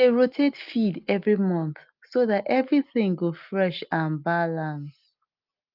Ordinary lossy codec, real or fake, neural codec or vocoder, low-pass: Opus, 24 kbps; real; none; 5.4 kHz